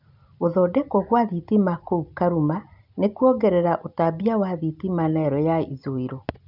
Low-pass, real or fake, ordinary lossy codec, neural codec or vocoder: 5.4 kHz; real; none; none